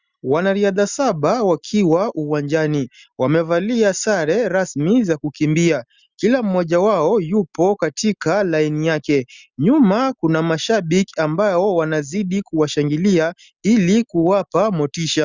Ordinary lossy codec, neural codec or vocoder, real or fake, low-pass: Opus, 64 kbps; none; real; 7.2 kHz